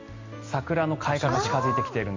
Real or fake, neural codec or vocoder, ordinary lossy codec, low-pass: real; none; MP3, 48 kbps; 7.2 kHz